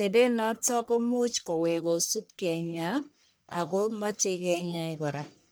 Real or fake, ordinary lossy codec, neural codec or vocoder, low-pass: fake; none; codec, 44.1 kHz, 1.7 kbps, Pupu-Codec; none